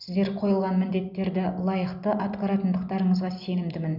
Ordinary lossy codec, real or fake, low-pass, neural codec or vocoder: none; real; 5.4 kHz; none